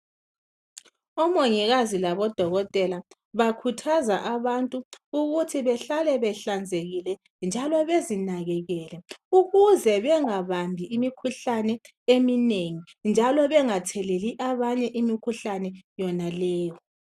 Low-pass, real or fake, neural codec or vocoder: 14.4 kHz; real; none